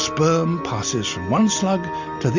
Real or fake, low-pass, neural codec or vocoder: real; 7.2 kHz; none